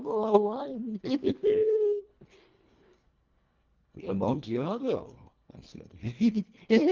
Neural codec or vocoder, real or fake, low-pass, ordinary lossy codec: codec, 24 kHz, 1.5 kbps, HILCodec; fake; 7.2 kHz; Opus, 24 kbps